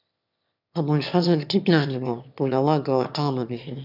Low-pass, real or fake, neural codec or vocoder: 5.4 kHz; fake; autoencoder, 22.05 kHz, a latent of 192 numbers a frame, VITS, trained on one speaker